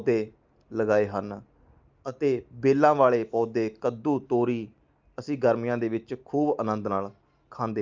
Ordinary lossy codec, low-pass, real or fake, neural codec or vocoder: Opus, 24 kbps; 7.2 kHz; real; none